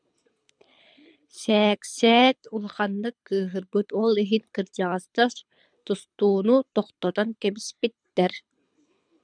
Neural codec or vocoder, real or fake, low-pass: codec, 24 kHz, 6 kbps, HILCodec; fake; 9.9 kHz